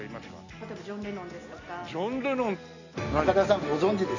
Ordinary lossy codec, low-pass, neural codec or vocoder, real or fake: none; 7.2 kHz; none; real